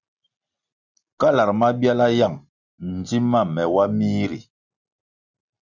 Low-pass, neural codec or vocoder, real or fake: 7.2 kHz; none; real